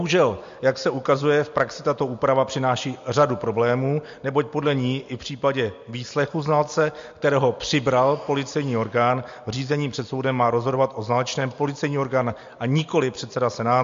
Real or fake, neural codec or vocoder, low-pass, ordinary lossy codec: real; none; 7.2 kHz; MP3, 48 kbps